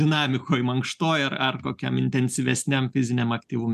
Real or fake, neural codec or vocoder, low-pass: real; none; 14.4 kHz